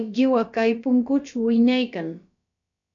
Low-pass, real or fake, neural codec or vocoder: 7.2 kHz; fake; codec, 16 kHz, about 1 kbps, DyCAST, with the encoder's durations